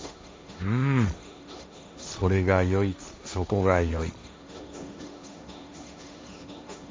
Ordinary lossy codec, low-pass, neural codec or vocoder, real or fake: none; none; codec, 16 kHz, 1.1 kbps, Voila-Tokenizer; fake